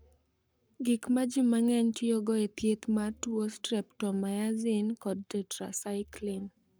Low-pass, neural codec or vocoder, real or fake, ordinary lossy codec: none; codec, 44.1 kHz, 7.8 kbps, Pupu-Codec; fake; none